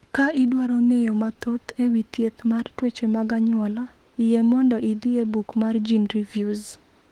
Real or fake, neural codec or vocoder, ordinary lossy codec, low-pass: fake; autoencoder, 48 kHz, 32 numbers a frame, DAC-VAE, trained on Japanese speech; Opus, 24 kbps; 19.8 kHz